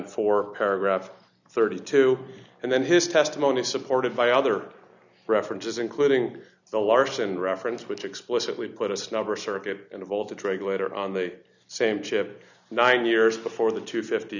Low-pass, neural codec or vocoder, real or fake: 7.2 kHz; none; real